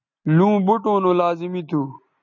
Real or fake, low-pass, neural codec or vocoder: real; 7.2 kHz; none